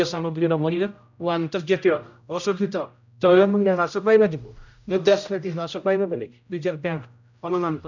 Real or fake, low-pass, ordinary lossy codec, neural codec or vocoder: fake; 7.2 kHz; none; codec, 16 kHz, 0.5 kbps, X-Codec, HuBERT features, trained on general audio